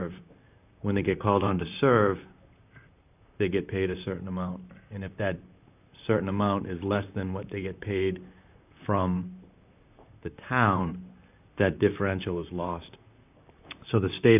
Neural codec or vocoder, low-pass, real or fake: vocoder, 44.1 kHz, 128 mel bands, Pupu-Vocoder; 3.6 kHz; fake